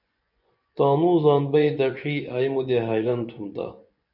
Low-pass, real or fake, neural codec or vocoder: 5.4 kHz; fake; vocoder, 24 kHz, 100 mel bands, Vocos